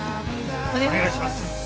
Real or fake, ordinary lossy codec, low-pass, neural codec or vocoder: real; none; none; none